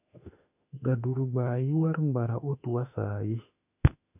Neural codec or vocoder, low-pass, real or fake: autoencoder, 48 kHz, 32 numbers a frame, DAC-VAE, trained on Japanese speech; 3.6 kHz; fake